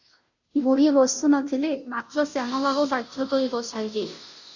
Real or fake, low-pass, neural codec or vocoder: fake; 7.2 kHz; codec, 16 kHz, 0.5 kbps, FunCodec, trained on Chinese and English, 25 frames a second